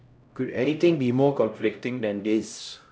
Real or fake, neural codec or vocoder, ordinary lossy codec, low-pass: fake; codec, 16 kHz, 0.5 kbps, X-Codec, HuBERT features, trained on LibriSpeech; none; none